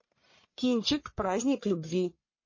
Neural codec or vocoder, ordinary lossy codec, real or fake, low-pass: codec, 44.1 kHz, 1.7 kbps, Pupu-Codec; MP3, 32 kbps; fake; 7.2 kHz